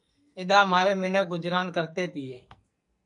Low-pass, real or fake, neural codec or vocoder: 10.8 kHz; fake; codec, 44.1 kHz, 2.6 kbps, SNAC